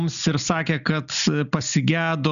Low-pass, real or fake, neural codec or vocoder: 7.2 kHz; real; none